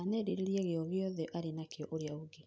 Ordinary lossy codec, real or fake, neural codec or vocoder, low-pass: none; real; none; none